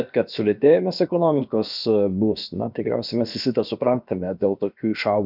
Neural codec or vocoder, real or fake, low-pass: codec, 16 kHz, about 1 kbps, DyCAST, with the encoder's durations; fake; 5.4 kHz